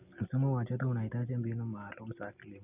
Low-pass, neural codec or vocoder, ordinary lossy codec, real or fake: 3.6 kHz; none; none; real